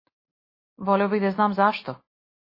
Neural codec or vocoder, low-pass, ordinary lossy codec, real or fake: none; 5.4 kHz; MP3, 24 kbps; real